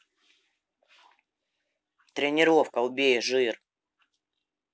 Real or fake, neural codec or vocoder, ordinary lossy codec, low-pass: real; none; none; none